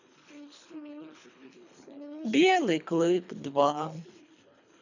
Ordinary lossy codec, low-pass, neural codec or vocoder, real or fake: none; 7.2 kHz; codec, 24 kHz, 3 kbps, HILCodec; fake